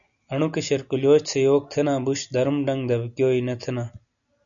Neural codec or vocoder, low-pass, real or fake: none; 7.2 kHz; real